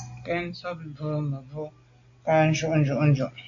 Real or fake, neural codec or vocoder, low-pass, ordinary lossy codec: real; none; 7.2 kHz; AAC, 48 kbps